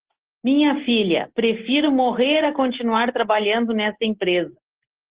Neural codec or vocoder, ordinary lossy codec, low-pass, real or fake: none; Opus, 16 kbps; 3.6 kHz; real